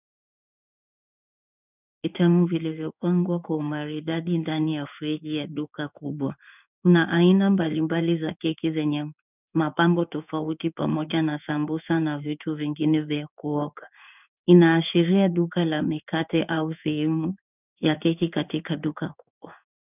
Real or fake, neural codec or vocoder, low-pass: fake; codec, 16 kHz in and 24 kHz out, 1 kbps, XY-Tokenizer; 3.6 kHz